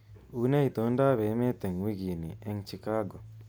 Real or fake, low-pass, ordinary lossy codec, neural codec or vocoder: real; none; none; none